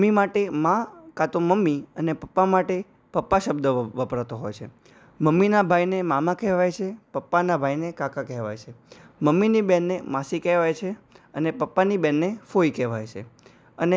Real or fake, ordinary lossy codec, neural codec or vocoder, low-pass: real; none; none; none